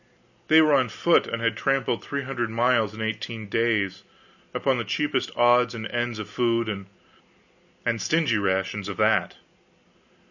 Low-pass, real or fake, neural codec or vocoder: 7.2 kHz; real; none